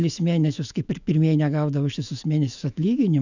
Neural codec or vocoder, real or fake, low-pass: autoencoder, 48 kHz, 128 numbers a frame, DAC-VAE, trained on Japanese speech; fake; 7.2 kHz